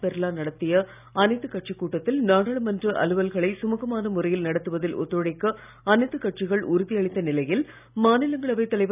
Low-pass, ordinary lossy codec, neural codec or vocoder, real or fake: 3.6 kHz; none; none; real